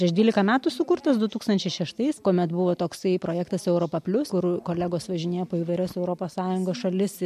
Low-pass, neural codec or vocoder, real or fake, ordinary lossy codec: 14.4 kHz; none; real; MP3, 64 kbps